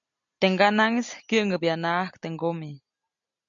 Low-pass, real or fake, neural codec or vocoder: 7.2 kHz; real; none